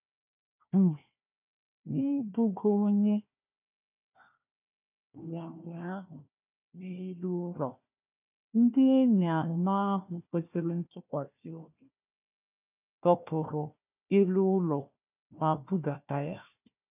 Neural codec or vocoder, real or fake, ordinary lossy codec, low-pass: codec, 16 kHz, 1 kbps, FunCodec, trained on Chinese and English, 50 frames a second; fake; none; 3.6 kHz